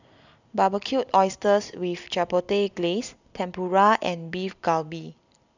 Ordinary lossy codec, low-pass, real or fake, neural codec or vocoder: none; 7.2 kHz; real; none